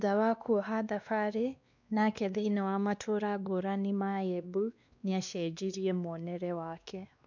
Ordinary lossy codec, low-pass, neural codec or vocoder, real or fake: none; 7.2 kHz; codec, 16 kHz, 2 kbps, X-Codec, WavLM features, trained on Multilingual LibriSpeech; fake